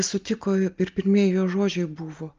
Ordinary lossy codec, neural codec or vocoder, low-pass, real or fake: Opus, 24 kbps; none; 7.2 kHz; real